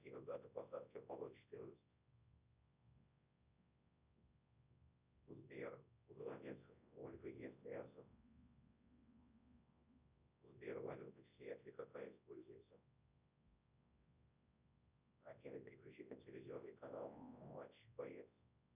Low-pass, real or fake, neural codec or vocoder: 3.6 kHz; fake; codec, 24 kHz, 0.9 kbps, WavTokenizer, large speech release